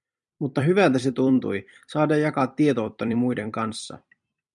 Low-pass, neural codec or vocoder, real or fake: 10.8 kHz; vocoder, 44.1 kHz, 128 mel bands every 256 samples, BigVGAN v2; fake